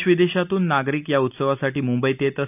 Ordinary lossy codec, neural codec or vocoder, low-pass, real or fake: none; none; 3.6 kHz; real